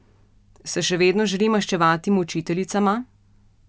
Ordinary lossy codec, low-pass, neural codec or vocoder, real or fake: none; none; none; real